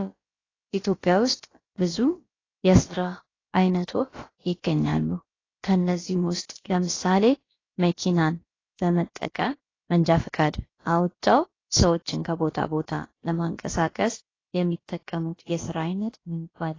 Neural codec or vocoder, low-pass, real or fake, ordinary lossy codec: codec, 16 kHz, about 1 kbps, DyCAST, with the encoder's durations; 7.2 kHz; fake; AAC, 32 kbps